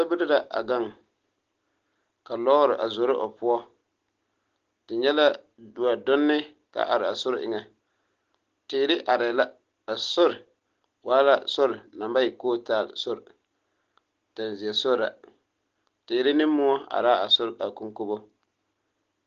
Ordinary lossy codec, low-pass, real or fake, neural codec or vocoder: Opus, 16 kbps; 7.2 kHz; real; none